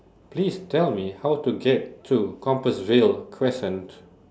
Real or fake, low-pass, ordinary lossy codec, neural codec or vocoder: real; none; none; none